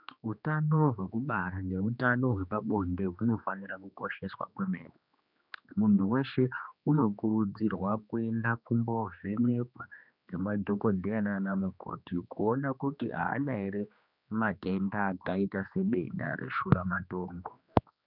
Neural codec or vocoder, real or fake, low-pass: codec, 16 kHz, 2 kbps, X-Codec, HuBERT features, trained on general audio; fake; 5.4 kHz